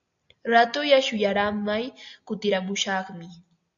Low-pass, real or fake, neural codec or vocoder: 7.2 kHz; real; none